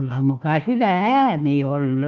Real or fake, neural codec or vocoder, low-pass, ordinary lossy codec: fake; codec, 16 kHz, 1 kbps, FunCodec, trained on Chinese and English, 50 frames a second; 7.2 kHz; Opus, 32 kbps